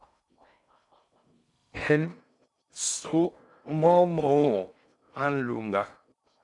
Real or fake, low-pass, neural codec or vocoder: fake; 10.8 kHz; codec, 16 kHz in and 24 kHz out, 0.6 kbps, FocalCodec, streaming, 2048 codes